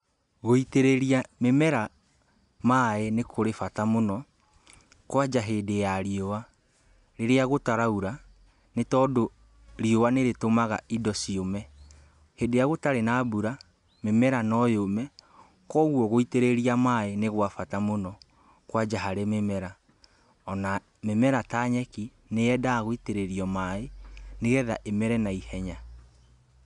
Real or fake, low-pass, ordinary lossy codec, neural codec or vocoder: real; 10.8 kHz; none; none